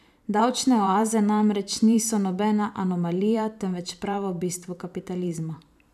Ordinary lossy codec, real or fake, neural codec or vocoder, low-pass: none; fake; vocoder, 44.1 kHz, 128 mel bands every 512 samples, BigVGAN v2; 14.4 kHz